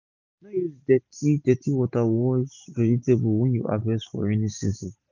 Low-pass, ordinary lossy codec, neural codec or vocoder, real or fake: 7.2 kHz; none; none; real